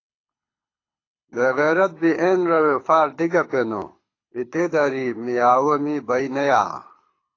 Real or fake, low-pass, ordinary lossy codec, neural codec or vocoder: fake; 7.2 kHz; AAC, 32 kbps; codec, 24 kHz, 6 kbps, HILCodec